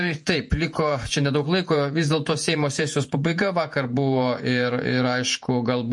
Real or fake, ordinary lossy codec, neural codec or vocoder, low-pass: fake; MP3, 48 kbps; vocoder, 48 kHz, 128 mel bands, Vocos; 10.8 kHz